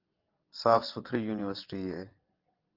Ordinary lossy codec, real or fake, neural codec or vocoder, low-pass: Opus, 24 kbps; fake; vocoder, 22.05 kHz, 80 mel bands, WaveNeXt; 5.4 kHz